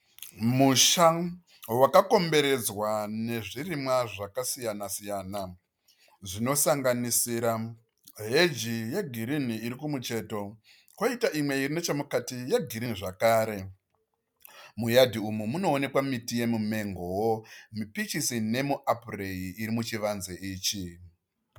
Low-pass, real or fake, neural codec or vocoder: 19.8 kHz; real; none